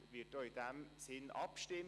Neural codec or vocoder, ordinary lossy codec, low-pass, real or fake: none; none; none; real